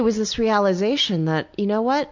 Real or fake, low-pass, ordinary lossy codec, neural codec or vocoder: real; 7.2 kHz; MP3, 64 kbps; none